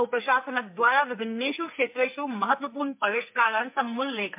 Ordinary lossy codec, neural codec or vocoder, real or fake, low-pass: MP3, 32 kbps; codec, 44.1 kHz, 2.6 kbps, SNAC; fake; 3.6 kHz